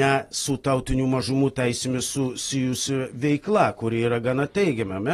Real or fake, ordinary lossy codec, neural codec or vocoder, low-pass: real; AAC, 32 kbps; none; 19.8 kHz